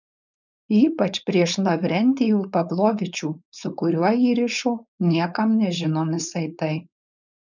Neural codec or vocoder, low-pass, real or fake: codec, 16 kHz, 4.8 kbps, FACodec; 7.2 kHz; fake